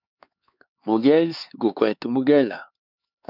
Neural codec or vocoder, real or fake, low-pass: codec, 16 kHz, 4 kbps, X-Codec, HuBERT features, trained on LibriSpeech; fake; 5.4 kHz